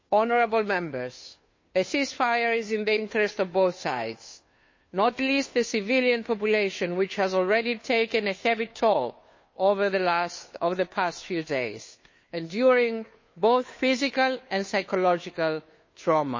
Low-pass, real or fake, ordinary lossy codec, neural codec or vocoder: 7.2 kHz; fake; MP3, 32 kbps; codec, 16 kHz, 2 kbps, FunCodec, trained on Chinese and English, 25 frames a second